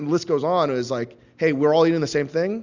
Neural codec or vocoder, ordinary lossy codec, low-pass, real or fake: none; Opus, 64 kbps; 7.2 kHz; real